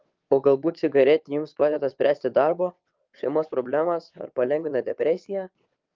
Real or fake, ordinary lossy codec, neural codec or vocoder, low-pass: fake; Opus, 32 kbps; vocoder, 22.05 kHz, 80 mel bands, WaveNeXt; 7.2 kHz